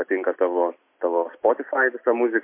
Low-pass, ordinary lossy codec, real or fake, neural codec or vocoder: 3.6 kHz; MP3, 24 kbps; real; none